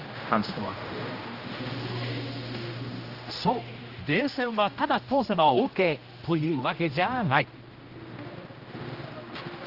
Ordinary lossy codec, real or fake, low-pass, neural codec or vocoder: Opus, 32 kbps; fake; 5.4 kHz; codec, 16 kHz, 1 kbps, X-Codec, HuBERT features, trained on general audio